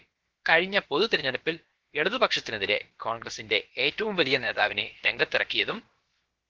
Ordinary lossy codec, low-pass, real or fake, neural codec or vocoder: Opus, 16 kbps; 7.2 kHz; fake; codec, 16 kHz, about 1 kbps, DyCAST, with the encoder's durations